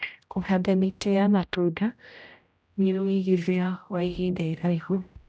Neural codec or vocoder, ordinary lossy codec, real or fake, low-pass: codec, 16 kHz, 0.5 kbps, X-Codec, HuBERT features, trained on general audio; none; fake; none